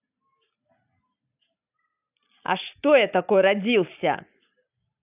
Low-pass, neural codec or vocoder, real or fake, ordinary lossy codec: 3.6 kHz; none; real; AAC, 32 kbps